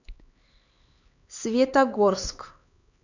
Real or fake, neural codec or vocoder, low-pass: fake; codec, 16 kHz, 4 kbps, X-Codec, HuBERT features, trained on LibriSpeech; 7.2 kHz